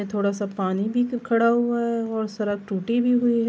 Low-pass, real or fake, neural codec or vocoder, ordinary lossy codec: none; real; none; none